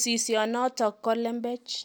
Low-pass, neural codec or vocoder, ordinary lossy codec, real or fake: none; none; none; real